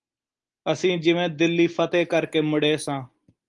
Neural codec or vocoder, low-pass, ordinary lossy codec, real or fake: none; 10.8 kHz; Opus, 24 kbps; real